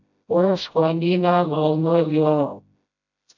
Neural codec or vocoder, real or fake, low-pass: codec, 16 kHz, 0.5 kbps, FreqCodec, smaller model; fake; 7.2 kHz